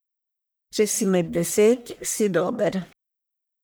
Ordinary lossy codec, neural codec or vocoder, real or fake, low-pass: none; codec, 44.1 kHz, 1.7 kbps, Pupu-Codec; fake; none